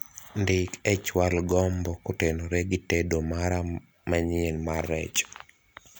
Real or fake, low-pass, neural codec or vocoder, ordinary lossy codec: real; none; none; none